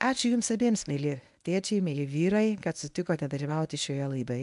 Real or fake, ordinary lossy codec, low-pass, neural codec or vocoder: fake; AAC, 96 kbps; 10.8 kHz; codec, 24 kHz, 0.9 kbps, WavTokenizer, medium speech release version 1